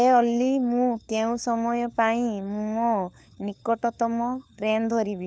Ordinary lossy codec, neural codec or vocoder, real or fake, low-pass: none; codec, 16 kHz, 4 kbps, FunCodec, trained on LibriTTS, 50 frames a second; fake; none